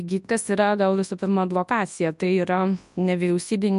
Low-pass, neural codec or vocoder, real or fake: 10.8 kHz; codec, 24 kHz, 0.9 kbps, WavTokenizer, large speech release; fake